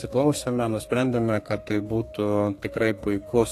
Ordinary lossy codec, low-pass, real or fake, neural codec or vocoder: AAC, 48 kbps; 14.4 kHz; fake; codec, 32 kHz, 1.9 kbps, SNAC